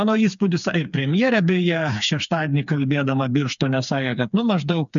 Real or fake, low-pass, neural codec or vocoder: fake; 7.2 kHz; codec, 16 kHz, 4 kbps, FreqCodec, smaller model